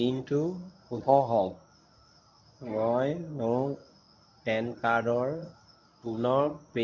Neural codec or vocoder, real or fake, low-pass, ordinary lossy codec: codec, 24 kHz, 0.9 kbps, WavTokenizer, medium speech release version 1; fake; 7.2 kHz; none